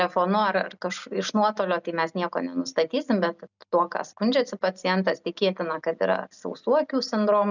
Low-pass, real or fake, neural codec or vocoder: 7.2 kHz; real; none